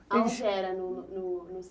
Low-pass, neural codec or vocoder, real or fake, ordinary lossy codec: none; none; real; none